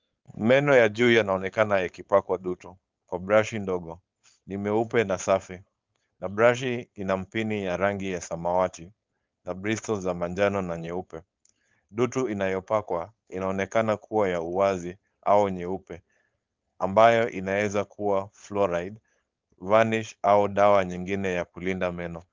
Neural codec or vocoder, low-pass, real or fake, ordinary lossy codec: codec, 16 kHz, 4.8 kbps, FACodec; 7.2 kHz; fake; Opus, 24 kbps